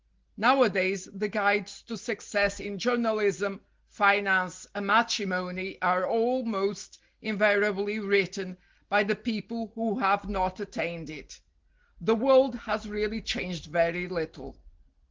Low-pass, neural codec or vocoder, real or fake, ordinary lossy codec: 7.2 kHz; none; real; Opus, 16 kbps